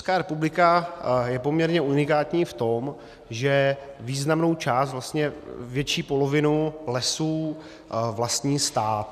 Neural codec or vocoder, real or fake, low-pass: none; real; 14.4 kHz